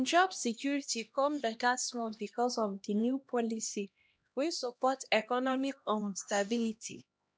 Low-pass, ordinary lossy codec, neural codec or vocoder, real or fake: none; none; codec, 16 kHz, 1 kbps, X-Codec, HuBERT features, trained on LibriSpeech; fake